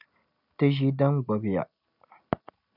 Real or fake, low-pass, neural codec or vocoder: real; 5.4 kHz; none